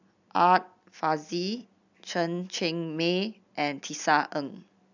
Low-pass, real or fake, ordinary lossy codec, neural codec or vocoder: 7.2 kHz; real; none; none